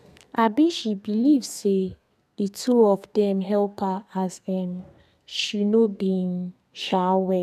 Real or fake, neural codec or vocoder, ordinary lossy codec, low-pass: fake; codec, 32 kHz, 1.9 kbps, SNAC; none; 14.4 kHz